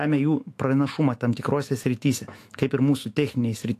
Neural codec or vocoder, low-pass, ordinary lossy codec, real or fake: autoencoder, 48 kHz, 128 numbers a frame, DAC-VAE, trained on Japanese speech; 14.4 kHz; AAC, 64 kbps; fake